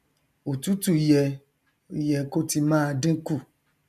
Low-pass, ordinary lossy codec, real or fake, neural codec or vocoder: 14.4 kHz; none; real; none